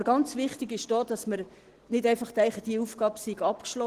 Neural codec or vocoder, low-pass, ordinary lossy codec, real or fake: none; 14.4 kHz; Opus, 16 kbps; real